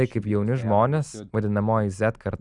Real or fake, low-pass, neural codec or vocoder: real; 10.8 kHz; none